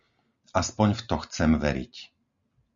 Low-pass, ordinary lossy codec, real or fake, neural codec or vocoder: 7.2 kHz; Opus, 64 kbps; real; none